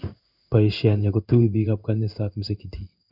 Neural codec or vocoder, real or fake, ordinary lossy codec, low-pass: codec, 16 kHz in and 24 kHz out, 1 kbps, XY-Tokenizer; fake; none; 5.4 kHz